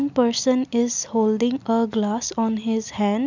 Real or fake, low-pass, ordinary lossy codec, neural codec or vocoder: real; 7.2 kHz; none; none